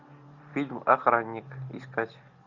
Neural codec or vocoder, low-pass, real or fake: none; 7.2 kHz; real